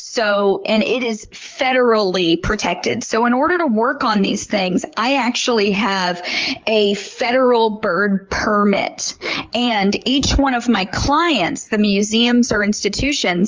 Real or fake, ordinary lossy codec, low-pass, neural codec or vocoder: fake; Opus, 32 kbps; 7.2 kHz; codec, 16 kHz, 4 kbps, FreqCodec, larger model